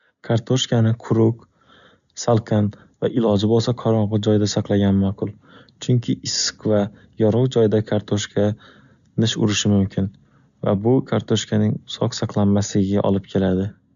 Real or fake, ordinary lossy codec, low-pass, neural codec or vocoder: real; none; 7.2 kHz; none